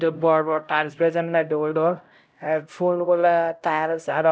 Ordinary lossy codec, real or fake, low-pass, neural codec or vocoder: none; fake; none; codec, 16 kHz, 0.5 kbps, X-Codec, HuBERT features, trained on LibriSpeech